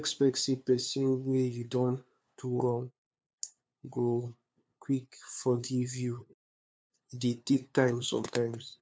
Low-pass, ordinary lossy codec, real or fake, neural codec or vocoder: none; none; fake; codec, 16 kHz, 2 kbps, FunCodec, trained on LibriTTS, 25 frames a second